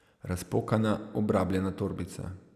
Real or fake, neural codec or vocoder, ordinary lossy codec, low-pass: real; none; none; 14.4 kHz